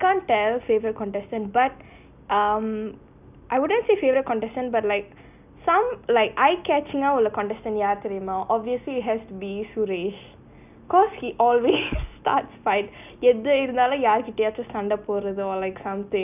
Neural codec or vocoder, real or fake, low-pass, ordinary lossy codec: none; real; 3.6 kHz; none